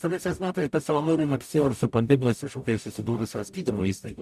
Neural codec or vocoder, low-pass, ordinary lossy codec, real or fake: codec, 44.1 kHz, 0.9 kbps, DAC; 14.4 kHz; AAC, 96 kbps; fake